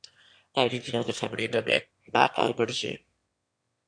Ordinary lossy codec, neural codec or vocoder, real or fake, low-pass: MP3, 64 kbps; autoencoder, 22.05 kHz, a latent of 192 numbers a frame, VITS, trained on one speaker; fake; 9.9 kHz